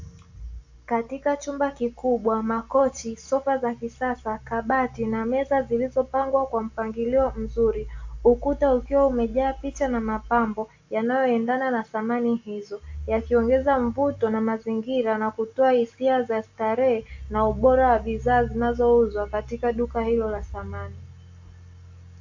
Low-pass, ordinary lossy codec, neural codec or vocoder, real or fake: 7.2 kHz; AAC, 48 kbps; none; real